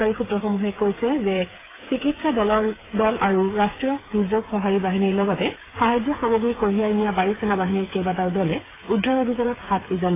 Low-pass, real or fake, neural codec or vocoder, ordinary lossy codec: 3.6 kHz; fake; codec, 16 kHz, 8 kbps, FreqCodec, smaller model; AAC, 16 kbps